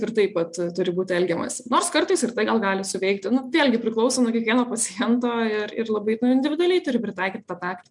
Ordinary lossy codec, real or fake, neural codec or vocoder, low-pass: MP3, 96 kbps; real; none; 10.8 kHz